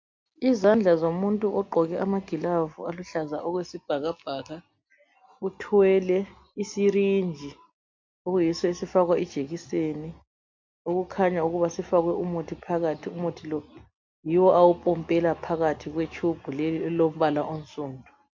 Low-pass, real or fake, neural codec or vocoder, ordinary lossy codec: 7.2 kHz; real; none; MP3, 48 kbps